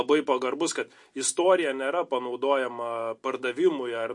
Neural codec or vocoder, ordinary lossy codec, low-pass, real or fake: none; MP3, 48 kbps; 10.8 kHz; real